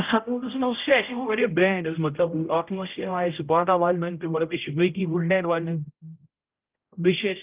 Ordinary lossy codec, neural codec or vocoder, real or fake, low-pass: Opus, 32 kbps; codec, 16 kHz, 0.5 kbps, X-Codec, HuBERT features, trained on general audio; fake; 3.6 kHz